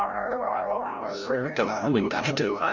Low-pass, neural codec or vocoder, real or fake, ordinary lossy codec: 7.2 kHz; codec, 16 kHz, 0.5 kbps, FreqCodec, larger model; fake; Opus, 64 kbps